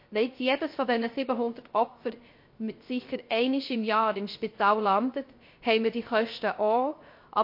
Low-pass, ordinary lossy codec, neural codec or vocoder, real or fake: 5.4 kHz; MP3, 32 kbps; codec, 16 kHz, 0.3 kbps, FocalCodec; fake